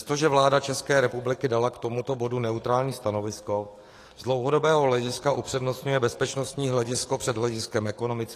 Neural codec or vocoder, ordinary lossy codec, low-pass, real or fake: codec, 44.1 kHz, 7.8 kbps, DAC; AAC, 48 kbps; 14.4 kHz; fake